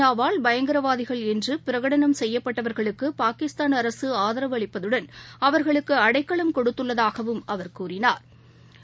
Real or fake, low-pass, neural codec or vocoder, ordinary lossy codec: real; none; none; none